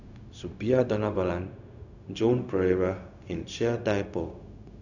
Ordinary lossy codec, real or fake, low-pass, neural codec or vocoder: none; fake; 7.2 kHz; codec, 16 kHz, 0.4 kbps, LongCat-Audio-Codec